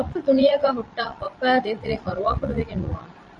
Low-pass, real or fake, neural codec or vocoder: 9.9 kHz; fake; vocoder, 22.05 kHz, 80 mel bands, Vocos